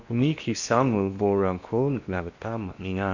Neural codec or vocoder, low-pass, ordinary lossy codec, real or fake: codec, 16 kHz in and 24 kHz out, 0.8 kbps, FocalCodec, streaming, 65536 codes; 7.2 kHz; none; fake